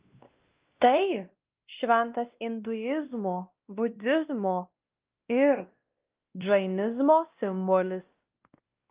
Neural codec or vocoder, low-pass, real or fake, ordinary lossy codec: codec, 16 kHz, 1 kbps, X-Codec, WavLM features, trained on Multilingual LibriSpeech; 3.6 kHz; fake; Opus, 24 kbps